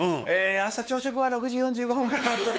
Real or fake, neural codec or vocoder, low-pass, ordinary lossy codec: fake; codec, 16 kHz, 4 kbps, X-Codec, WavLM features, trained on Multilingual LibriSpeech; none; none